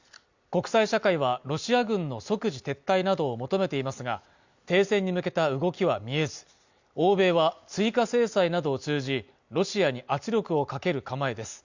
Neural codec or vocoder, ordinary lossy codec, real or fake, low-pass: none; Opus, 64 kbps; real; 7.2 kHz